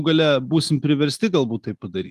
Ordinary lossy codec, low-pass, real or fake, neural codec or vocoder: Opus, 32 kbps; 14.4 kHz; real; none